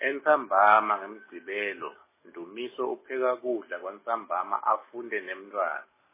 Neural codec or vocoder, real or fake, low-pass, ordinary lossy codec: none; real; 3.6 kHz; MP3, 16 kbps